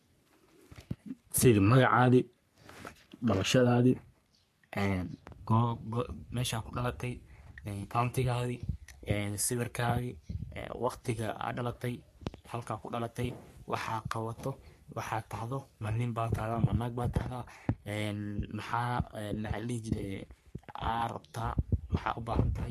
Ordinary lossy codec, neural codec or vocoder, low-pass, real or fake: MP3, 64 kbps; codec, 44.1 kHz, 3.4 kbps, Pupu-Codec; 14.4 kHz; fake